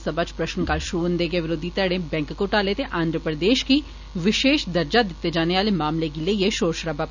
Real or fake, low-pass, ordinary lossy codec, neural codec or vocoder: real; 7.2 kHz; none; none